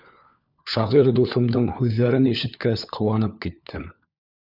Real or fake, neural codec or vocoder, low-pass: fake; codec, 16 kHz, 4 kbps, FunCodec, trained on LibriTTS, 50 frames a second; 5.4 kHz